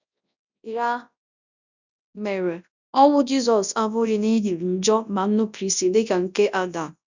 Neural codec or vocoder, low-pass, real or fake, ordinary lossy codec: codec, 24 kHz, 0.9 kbps, WavTokenizer, large speech release; 7.2 kHz; fake; none